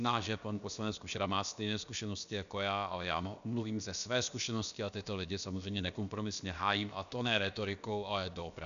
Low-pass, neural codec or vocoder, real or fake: 7.2 kHz; codec, 16 kHz, about 1 kbps, DyCAST, with the encoder's durations; fake